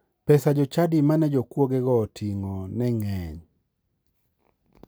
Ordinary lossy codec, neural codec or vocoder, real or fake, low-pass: none; none; real; none